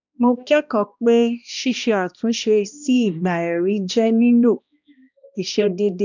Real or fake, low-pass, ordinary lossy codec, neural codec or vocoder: fake; 7.2 kHz; none; codec, 16 kHz, 1 kbps, X-Codec, HuBERT features, trained on balanced general audio